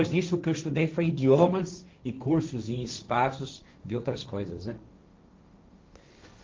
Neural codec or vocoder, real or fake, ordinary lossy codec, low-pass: codec, 16 kHz, 1.1 kbps, Voila-Tokenizer; fake; Opus, 32 kbps; 7.2 kHz